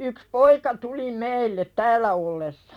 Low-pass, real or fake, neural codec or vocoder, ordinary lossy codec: 19.8 kHz; fake; vocoder, 44.1 kHz, 128 mel bands every 256 samples, BigVGAN v2; none